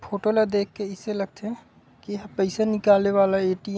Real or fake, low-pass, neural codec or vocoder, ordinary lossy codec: real; none; none; none